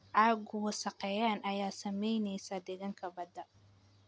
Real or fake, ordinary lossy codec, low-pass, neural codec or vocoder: real; none; none; none